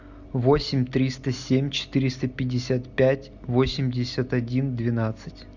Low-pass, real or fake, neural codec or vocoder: 7.2 kHz; real; none